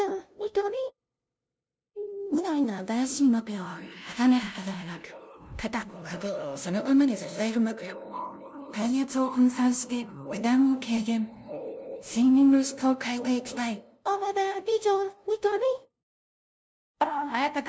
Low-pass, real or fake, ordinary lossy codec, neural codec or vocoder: none; fake; none; codec, 16 kHz, 0.5 kbps, FunCodec, trained on LibriTTS, 25 frames a second